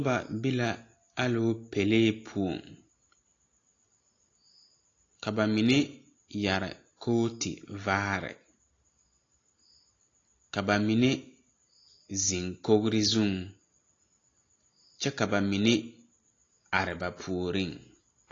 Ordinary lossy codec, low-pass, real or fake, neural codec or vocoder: AAC, 32 kbps; 7.2 kHz; real; none